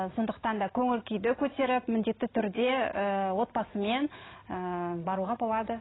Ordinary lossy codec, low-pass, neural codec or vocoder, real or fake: AAC, 16 kbps; 7.2 kHz; none; real